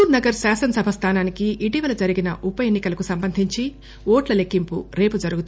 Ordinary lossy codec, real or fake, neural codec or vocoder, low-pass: none; real; none; none